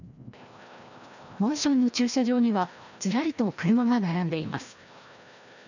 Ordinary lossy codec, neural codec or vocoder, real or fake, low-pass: none; codec, 16 kHz, 1 kbps, FreqCodec, larger model; fake; 7.2 kHz